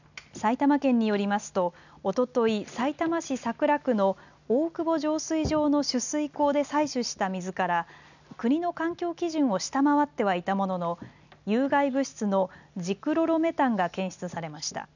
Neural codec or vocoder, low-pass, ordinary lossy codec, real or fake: none; 7.2 kHz; none; real